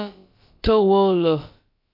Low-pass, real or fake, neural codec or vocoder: 5.4 kHz; fake; codec, 16 kHz, about 1 kbps, DyCAST, with the encoder's durations